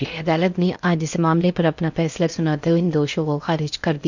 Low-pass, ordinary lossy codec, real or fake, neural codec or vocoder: 7.2 kHz; none; fake; codec, 16 kHz in and 24 kHz out, 0.8 kbps, FocalCodec, streaming, 65536 codes